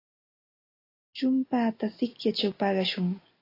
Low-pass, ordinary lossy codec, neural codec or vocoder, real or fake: 5.4 kHz; AAC, 32 kbps; none; real